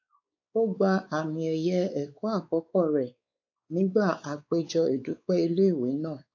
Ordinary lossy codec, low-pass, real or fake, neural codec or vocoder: AAC, 48 kbps; 7.2 kHz; fake; codec, 16 kHz, 4 kbps, X-Codec, WavLM features, trained on Multilingual LibriSpeech